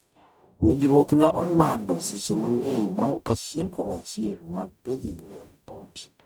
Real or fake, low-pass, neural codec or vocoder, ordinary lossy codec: fake; none; codec, 44.1 kHz, 0.9 kbps, DAC; none